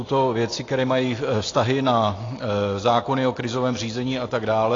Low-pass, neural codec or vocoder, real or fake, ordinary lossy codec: 7.2 kHz; none; real; AAC, 32 kbps